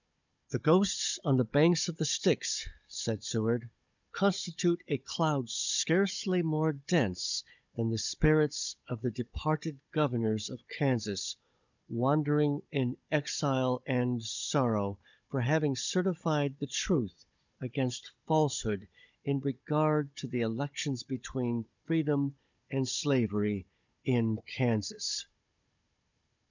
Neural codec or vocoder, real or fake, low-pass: codec, 16 kHz, 16 kbps, FunCodec, trained on Chinese and English, 50 frames a second; fake; 7.2 kHz